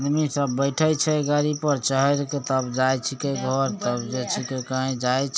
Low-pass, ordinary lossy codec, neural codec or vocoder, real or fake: none; none; none; real